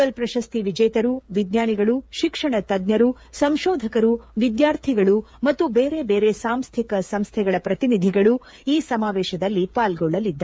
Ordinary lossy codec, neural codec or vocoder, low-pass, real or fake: none; codec, 16 kHz, 8 kbps, FreqCodec, smaller model; none; fake